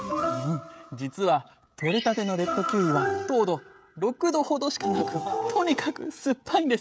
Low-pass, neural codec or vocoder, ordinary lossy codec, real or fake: none; codec, 16 kHz, 16 kbps, FreqCodec, smaller model; none; fake